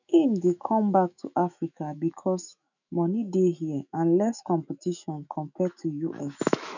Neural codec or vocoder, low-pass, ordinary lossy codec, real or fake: autoencoder, 48 kHz, 128 numbers a frame, DAC-VAE, trained on Japanese speech; 7.2 kHz; none; fake